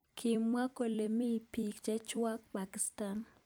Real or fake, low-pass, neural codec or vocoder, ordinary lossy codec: fake; none; vocoder, 44.1 kHz, 128 mel bands every 256 samples, BigVGAN v2; none